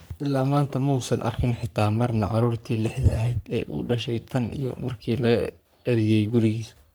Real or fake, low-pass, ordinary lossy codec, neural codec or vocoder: fake; none; none; codec, 44.1 kHz, 3.4 kbps, Pupu-Codec